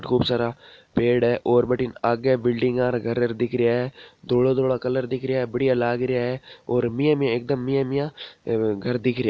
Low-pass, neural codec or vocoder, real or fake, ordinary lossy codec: none; none; real; none